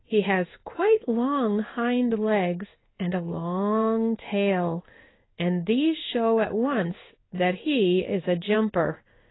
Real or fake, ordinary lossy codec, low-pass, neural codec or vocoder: fake; AAC, 16 kbps; 7.2 kHz; codec, 16 kHz in and 24 kHz out, 1 kbps, XY-Tokenizer